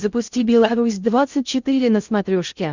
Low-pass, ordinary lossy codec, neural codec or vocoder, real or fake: 7.2 kHz; Opus, 64 kbps; codec, 16 kHz in and 24 kHz out, 0.6 kbps, FocalCodec, streaming, 2048 codes; fake